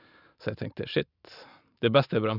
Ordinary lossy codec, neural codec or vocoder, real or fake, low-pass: none; vocoder, 44.1 kHz, 128 mel bands every 512 samples, BigVGAN v2; fake; 5.4 kHz